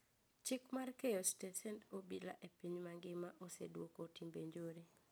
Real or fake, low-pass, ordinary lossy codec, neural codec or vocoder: real; none; none; none